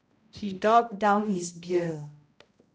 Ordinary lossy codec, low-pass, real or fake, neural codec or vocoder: none; none; fake; codec, 16 kHz, 0.5 kbps, X-Codec, HuBERT features, trained on balanced general audio